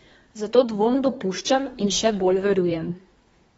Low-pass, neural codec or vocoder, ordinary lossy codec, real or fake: 10.8 kHz; codec, 24 kHz, 1 kbps, SNAC; AAC, 24 kbps; fake